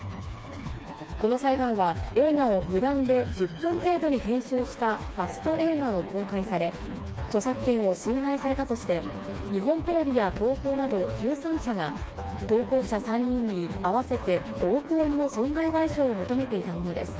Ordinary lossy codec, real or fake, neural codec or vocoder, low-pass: none; fake; codec, 16 kHz, 2 kbps, FreqCodec, smaller model; none